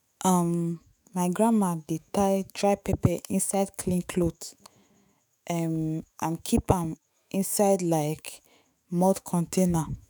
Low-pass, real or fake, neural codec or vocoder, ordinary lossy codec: none; fake; autoencoder, 48 kHz, 128 numbers a frame, DAC-VAE, trained on Japanese speech; none